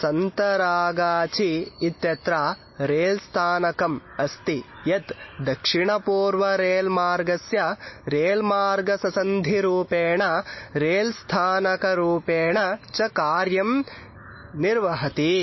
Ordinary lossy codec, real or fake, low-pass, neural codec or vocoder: MP3, 24 kbps; real; 7.2 kHz; none